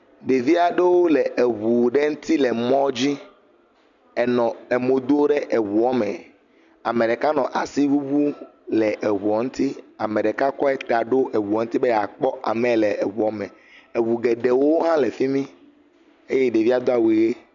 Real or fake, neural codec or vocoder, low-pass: real; none; 7.2 kHz